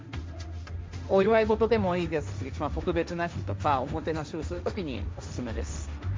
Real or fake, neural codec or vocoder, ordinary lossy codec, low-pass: fake; codec, 16 kHz, 1.1 kbps, Voila-Tokenizer; none; none